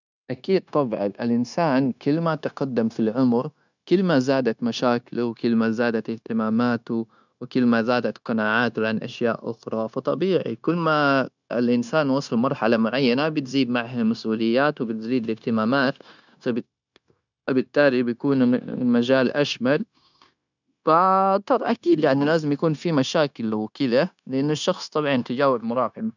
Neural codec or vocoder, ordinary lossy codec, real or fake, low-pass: codec, 16 kHz, 0.9 kbps, LongCat-Audio-Codec; none; fake; 7.2 kHz